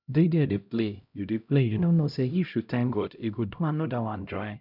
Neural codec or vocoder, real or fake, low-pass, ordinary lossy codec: codec, 16 kHz, 0.5 kbps, X-Codec, HuBERT features, trained on LibriSpeech; fake; 5.4 kHz; none